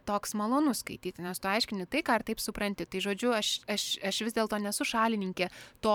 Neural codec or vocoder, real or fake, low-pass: vocoder, 44.1 kHz, 128 mel bands every 512 samples, BigVGAN v2; fake; 19.8 kHz